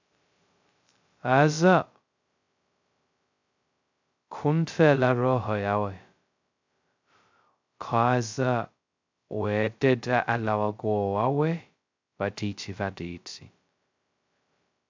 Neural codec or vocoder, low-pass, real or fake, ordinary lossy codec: codec, 16 kHz, 0.2 kbps, FocalCodec; 7.2 kHz; fake; MP3, 64 kbps